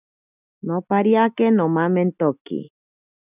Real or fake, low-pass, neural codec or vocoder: real; 3.6 kHz; none